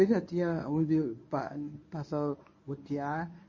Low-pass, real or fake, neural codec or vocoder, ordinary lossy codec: 7.2 kHz; fake; codec, 24 kHz, 0.9 kbps, WavTokenizer, medium speech release version 1; MP3, 32 kbps